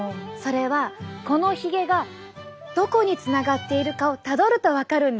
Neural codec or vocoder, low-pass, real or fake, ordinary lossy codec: none; none; real; none